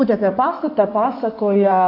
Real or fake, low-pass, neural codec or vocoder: fake; 5.4 kHz; codec, 16 kHz in and 24 kHz out, 2.2 kbps, FireRedTTS-2 codec